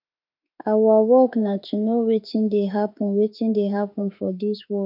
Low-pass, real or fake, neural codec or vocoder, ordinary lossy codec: 5.4 kHz; fake; autoencoder, 48 kHz, 32 numbers a frame, DAC-VAE, trained on Japanese speech; none